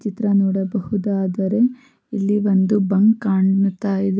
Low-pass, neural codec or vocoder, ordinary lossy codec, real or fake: none; none; none; real